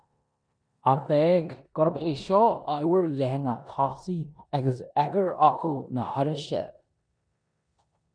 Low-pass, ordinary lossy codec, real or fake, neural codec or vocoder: 9.9 kHz; MP3, 96 kbps; fake; codec, 16 kHz in and 24 kHz out, 0.9 kbps, LongCat-Audio-Codec, four codebook decoder